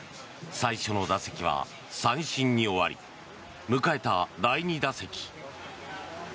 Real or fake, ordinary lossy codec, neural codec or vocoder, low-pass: real; none; none; none